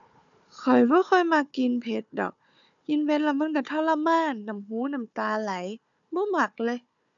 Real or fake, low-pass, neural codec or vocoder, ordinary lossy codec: fake; 7.2 kHz; codec, 16 kHz, 4 kbps, FunCodec, trained on Chinese and English, 50 frames a second; none